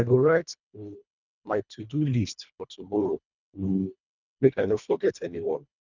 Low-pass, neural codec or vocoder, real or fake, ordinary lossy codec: 7.2 kHz; codec, 24 kHz, 1.5 kbps, HILCodec; fake; none